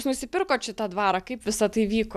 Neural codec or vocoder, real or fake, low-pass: none; real; 14.4 kHz